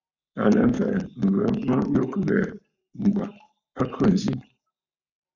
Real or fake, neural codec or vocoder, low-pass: fake; codec, 44.1 kHz, 7.8 kbps, Pupu-Codec; 7.2 kHz